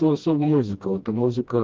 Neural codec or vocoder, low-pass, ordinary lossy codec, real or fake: codec, 16 kHz, 1 kbps, FreqCodec, smaller model; 7.2 kHz; Opus, 32 kbps; fake